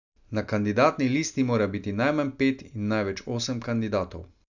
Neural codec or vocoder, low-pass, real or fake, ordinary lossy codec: none; 7.2 kHz; real; none